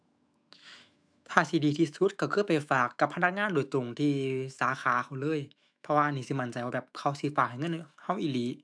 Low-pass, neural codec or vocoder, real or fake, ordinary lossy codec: 9.9 kHz; autoencoder, 48 kHz, 128 numbers a frame, DAC-VAE, trained on Japanese speech; fake; none